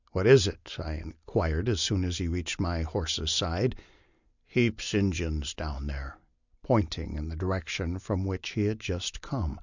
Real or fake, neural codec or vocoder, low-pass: real; none; 7.2 kHz